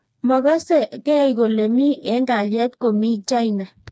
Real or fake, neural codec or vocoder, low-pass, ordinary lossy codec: fake; codec, 16 kHz, 2 kbps, FreqCodec, smaller model; none; none